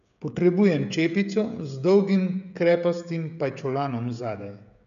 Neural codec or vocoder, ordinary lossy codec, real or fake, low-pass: codec, 16 kHz, 16 kbps, FreqCodec, smaller model; none; fake; 7.2 kHz